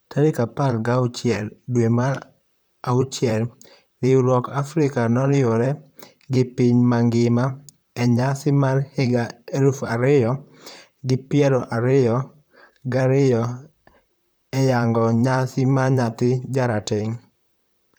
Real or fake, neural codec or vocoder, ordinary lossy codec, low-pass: fake; vocoder, 44.1 kHz, 128 mel bands, Pupu-Vocoder; none; none